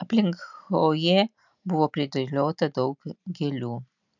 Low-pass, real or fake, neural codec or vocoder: 7.2 kHz; real; none